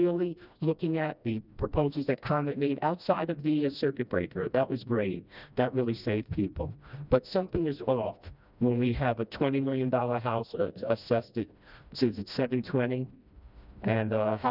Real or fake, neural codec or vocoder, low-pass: fake; codec, 16 kHz, 1 kbps, FreqCodec, smaller model; 5.4 kHz